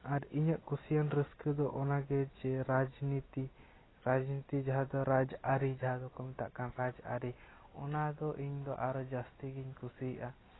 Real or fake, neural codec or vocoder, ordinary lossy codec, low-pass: real; none; AAC, 16 kbps; 7.2 kHz